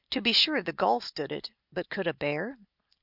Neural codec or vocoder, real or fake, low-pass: none; real; 5.4 kHz